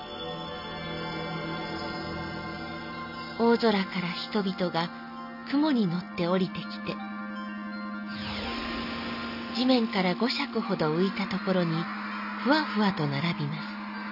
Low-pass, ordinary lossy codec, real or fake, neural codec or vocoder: 5.4 kHz; none; real; none